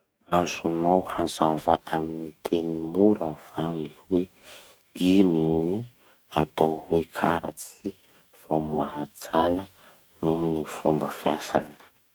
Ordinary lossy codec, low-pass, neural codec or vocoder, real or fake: none; none; codec, 44.1 kHz, 2.6 kbps, DAC; fake